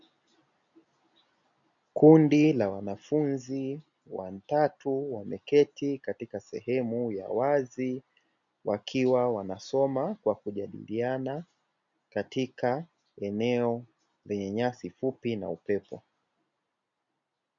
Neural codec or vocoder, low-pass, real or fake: none; 7.2 kHz; real